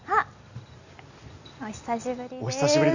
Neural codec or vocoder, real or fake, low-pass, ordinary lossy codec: none; real; 7.2 kHz; Opus, 64 kbps